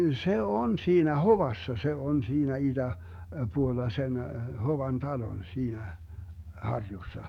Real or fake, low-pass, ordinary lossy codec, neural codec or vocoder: fake; 19.8 kHz; none; codec, 44.1 kHz, 7.8 kbps, DAC